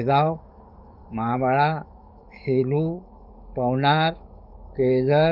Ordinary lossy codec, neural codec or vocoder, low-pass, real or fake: none; codec, 44.1 kHz, 7.8 kbps, DAC; 5.4 kHz; fake